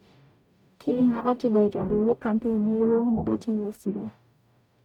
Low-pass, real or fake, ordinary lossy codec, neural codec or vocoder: 19.8 kHz; fake; none; codec, 44.1 kHz, 0.9 kbps, DAC